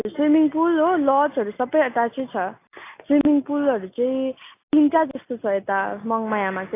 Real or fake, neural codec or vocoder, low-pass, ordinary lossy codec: real; none; 3.6 kHz; AAC, 24 kbps